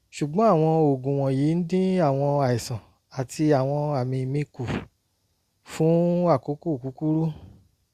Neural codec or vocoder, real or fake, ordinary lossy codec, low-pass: none; real; Opus, 64 kbps; 14.4 kHz